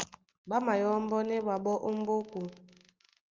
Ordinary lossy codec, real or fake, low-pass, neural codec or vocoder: Opus, 32 kbps; real; 7.2 kHz; none